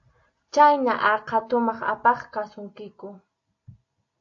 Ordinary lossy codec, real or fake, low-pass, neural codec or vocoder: AAC, 48 kbps; real; 7.2 kHz; none